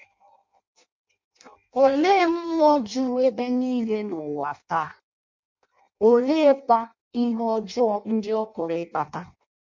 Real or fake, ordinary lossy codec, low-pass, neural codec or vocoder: fake; MP3, 48 kbps; 7.2 kHz; codec, 16 kHz in and 24 kHz out, 0.6 kbps, FireRedTTS-2 codec